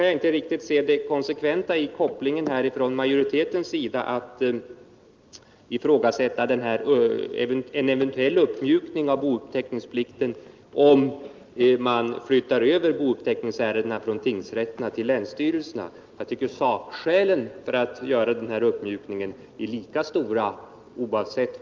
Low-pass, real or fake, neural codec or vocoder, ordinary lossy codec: 7.2 kHz; real; none; Opus, 24 kbps